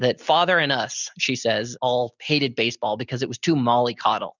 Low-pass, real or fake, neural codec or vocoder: 7.2 kHz; real; none